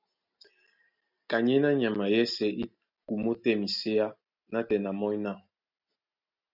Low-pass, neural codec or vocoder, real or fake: 5.4 kHz; none; real